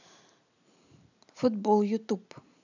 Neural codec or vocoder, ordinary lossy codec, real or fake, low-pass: none; none; real; 7.2 kHz